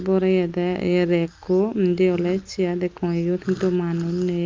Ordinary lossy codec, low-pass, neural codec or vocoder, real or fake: Opus, 32 kbps; 7.2 kHz; none; real